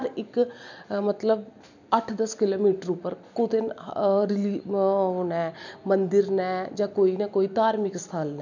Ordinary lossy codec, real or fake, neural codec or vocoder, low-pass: none; real; none; 7.2 kHz